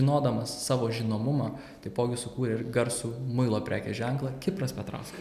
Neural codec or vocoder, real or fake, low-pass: none; real; 14.4 kHz